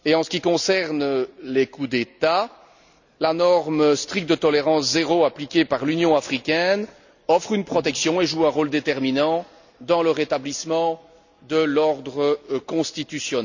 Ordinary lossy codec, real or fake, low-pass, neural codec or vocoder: none; real; 7.2 kHz; none